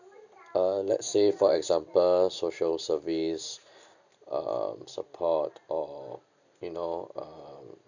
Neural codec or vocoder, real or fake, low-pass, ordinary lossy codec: none; real; 7.2 kHz; none